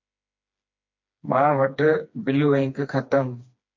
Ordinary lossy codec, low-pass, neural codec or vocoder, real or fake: MP3, 48 kbps; 7.2 kHz; codec, 16 kHz, 2 kbps, FreqCodec, smaller model; fake